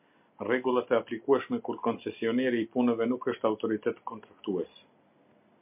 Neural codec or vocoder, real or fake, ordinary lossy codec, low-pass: none; real; MP3, 32 kbps; 3.6 kHz